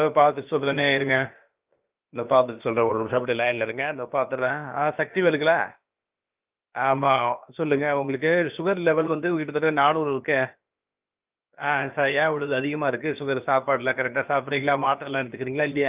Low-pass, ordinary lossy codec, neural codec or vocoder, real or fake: 3.6 kHz; Opus, 32 kbps; codec, 16 kHz, about 1 kbps, DyCAST, with the encoder's durations; fake